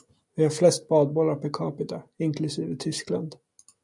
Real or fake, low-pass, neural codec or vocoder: real; 10.8 kHz; none